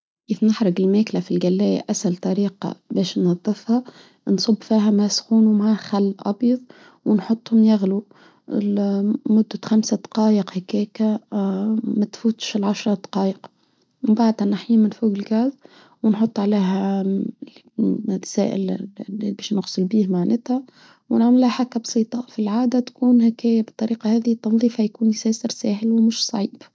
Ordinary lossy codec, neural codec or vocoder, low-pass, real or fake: none; none; none; real